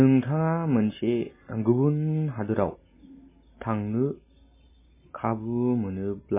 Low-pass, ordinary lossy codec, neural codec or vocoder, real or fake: 3.6 kHz; MP3, 16 kbps; none; real